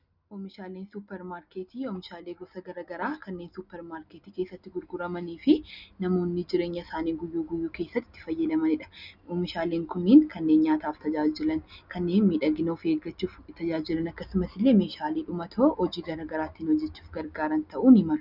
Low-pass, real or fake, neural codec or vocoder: 5.4 kHz; real; none